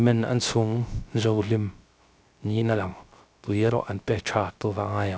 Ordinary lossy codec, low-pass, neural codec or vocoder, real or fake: none; none; codec, 16 kHz, 0.3 kbps, FocalCodec; fake